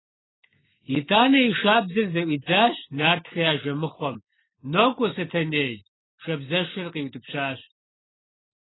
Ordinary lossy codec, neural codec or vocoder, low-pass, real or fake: AAC, 16 kbps; none; 7.2 kHz; real